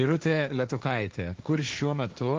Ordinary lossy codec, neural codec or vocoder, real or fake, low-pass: Opus, 32 kbps; codec, 16 kHz, 1.1 kbps, Voila-Tokenizer; fake; 7.2 kHz